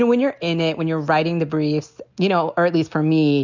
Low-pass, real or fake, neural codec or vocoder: 7.2 kHz; real; none